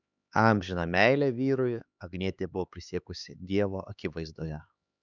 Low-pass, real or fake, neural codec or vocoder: 7.2 kHz; fake; codec, 16 kHz, 4 kbps, X-Codec, HuBERT features, trained on LibriSpeech